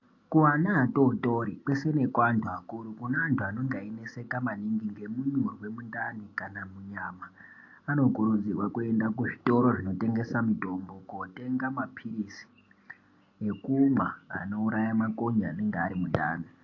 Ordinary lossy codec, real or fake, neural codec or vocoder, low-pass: AAC, 48 kbps; real; none; 7.2 kHz